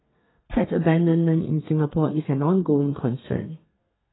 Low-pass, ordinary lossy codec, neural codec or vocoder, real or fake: 7.2 kHz; AAC, 16 kbps; codec, 32 kHz, 1.9 kbps, SNAC; fake